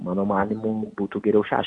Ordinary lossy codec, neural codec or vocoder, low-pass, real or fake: AAC, 48 kbps; none; 10.8 kHz; real